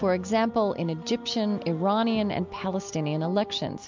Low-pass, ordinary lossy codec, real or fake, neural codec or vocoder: 7.2 kHz; MP3, 64 kbps; real; none